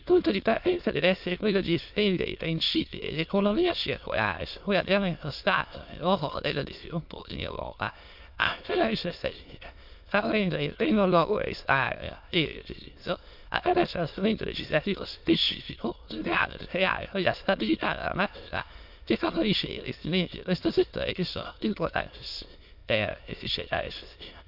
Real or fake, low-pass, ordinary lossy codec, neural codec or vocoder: fake; 5.4 kHz; MP3, 48 kbps; autoencoder, 22.05 kHz, a latent of 192 numbers a frame, VITS, trained on many speakers